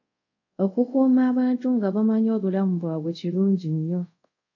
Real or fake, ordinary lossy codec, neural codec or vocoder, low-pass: fake; AAC, 48 kbps; codec, 24 kHz, 0.5 kbps, DualCodec; 7.2 kHz